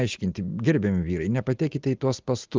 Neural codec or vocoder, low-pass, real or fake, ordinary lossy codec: none; 7.2 kHz; real; Opus, 32 kbps